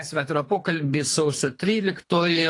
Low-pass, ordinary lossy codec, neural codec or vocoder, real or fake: 10.8 kHz; AAC, 48 kbps; codec, 24 kHz, 3 kbps, HILCodec; fake